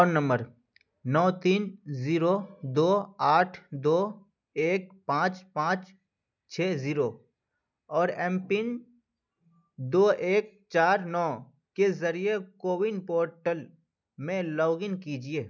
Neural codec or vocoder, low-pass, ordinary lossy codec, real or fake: none; 7.2 kHz; none; real